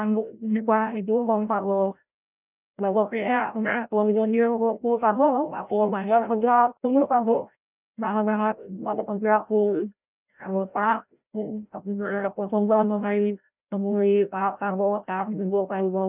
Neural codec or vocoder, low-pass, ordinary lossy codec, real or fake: codec, 16 kHz, 0.5 kbps, FreqCodec, larger model; 3.6 kHz; none; fake